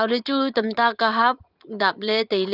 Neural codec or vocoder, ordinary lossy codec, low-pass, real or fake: vocoder, 44.1 kHz, 80 mel bands, Vocos; Opus, 24 kbps; 5.4 kHz; fake